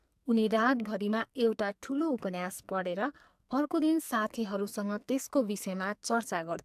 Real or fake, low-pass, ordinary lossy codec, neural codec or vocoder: fake; 14.4 kHz; AAC, 96 kbps; codec, 44.1 kHz, 2.6 kbps, SNAC